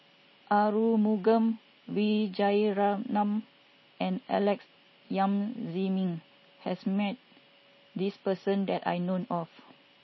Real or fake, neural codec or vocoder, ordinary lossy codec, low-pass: real; none; MP3, 24 kbps; 7.2 kHz